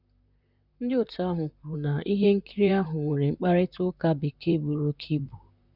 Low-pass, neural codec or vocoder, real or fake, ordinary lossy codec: 5.4 kHz; vocoder, 44.1 kHz, 128 mel bands, Pupu-Vocoder; fake; none